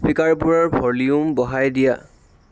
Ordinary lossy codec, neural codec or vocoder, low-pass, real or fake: none; none; none; real